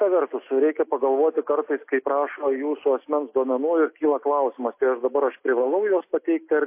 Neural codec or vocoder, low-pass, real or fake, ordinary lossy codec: none; 3.6 kHz; real; MP3, 24 kbps